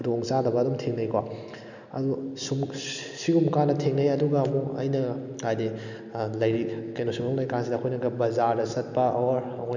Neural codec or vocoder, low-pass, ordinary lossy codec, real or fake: none; 7.2 kHz; none; real